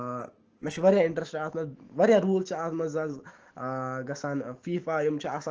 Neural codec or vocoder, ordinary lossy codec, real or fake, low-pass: autoencoder, 48 kHz, 128 numbers a frame, DAC-VAE, trained on Japanese speech; Opus, 16 kbps; fake; 7.2 kHz